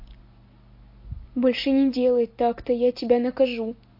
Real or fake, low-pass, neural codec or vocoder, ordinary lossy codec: real; 5.4 kHz; none; MP3, 32 kbps